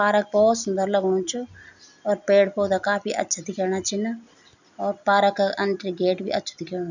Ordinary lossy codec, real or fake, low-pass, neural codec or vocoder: none; real; 7.2 kHz; none